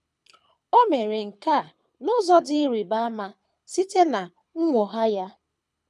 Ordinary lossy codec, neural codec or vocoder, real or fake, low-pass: none; codec, 24 kHz, 6 kbps, HILCodec; fake; none